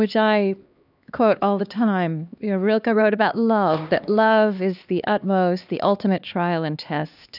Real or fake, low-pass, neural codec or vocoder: fake; 5.4 kHz; codec, 16 kHz, 2 kbps, X-Codec, HuBERT features, trained on LibriSpeech